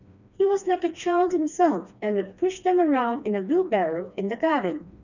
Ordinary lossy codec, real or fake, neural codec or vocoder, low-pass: none; fake; codec, 16 kHz, 2 kbps, FreqCodec, smaller model; 7.2 kHz